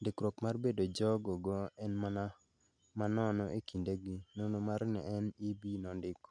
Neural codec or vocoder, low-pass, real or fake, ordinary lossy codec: none; 9.9 kHz; real; none